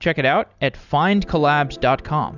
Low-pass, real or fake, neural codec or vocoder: 7.2 kHz; real; none